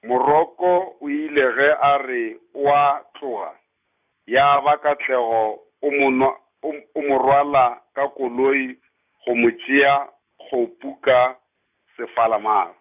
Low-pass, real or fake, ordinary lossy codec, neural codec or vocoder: 3.6 kHz; real; none; none